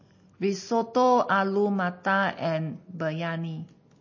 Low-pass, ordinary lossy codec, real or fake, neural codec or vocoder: 7.2 kHz; MP3, 32 kbps; real; none